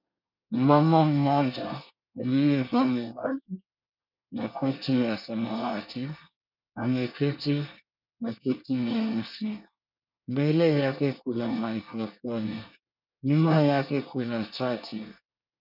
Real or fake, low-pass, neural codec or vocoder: fake; 5.4 kHz; codec, 24 kHz, 1 kbps, SNAC